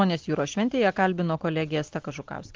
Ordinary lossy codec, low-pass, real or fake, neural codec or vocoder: Opus, 16 kbps; 7.2 kHz; real; none